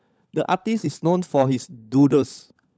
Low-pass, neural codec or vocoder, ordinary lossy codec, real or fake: none; codec, 16 kHz, 16 kbps, FunCodec, trained on LibriTTS, 50 frames a second; none; fake